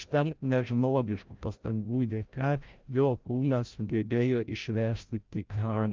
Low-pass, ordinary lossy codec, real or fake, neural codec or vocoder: 7.2 kHz; Opus, 32 kbps; fake; codec, 16 kHz, 0.5 kbps, FreqCodec, larger model